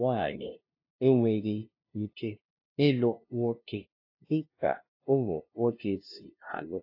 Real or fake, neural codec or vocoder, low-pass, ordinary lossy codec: fake; codec, 16 kHz, 0.5 kbps, FunCodec, trained on LibriTTS, 25 frames a second; 5.4 kHz; AAC, 32 kbps